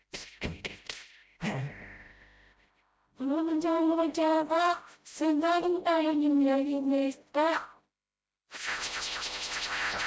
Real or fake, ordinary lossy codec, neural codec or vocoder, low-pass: fake; none; codec, 16 kHz, 0.5 kbps, FreqCodec, smaller model; none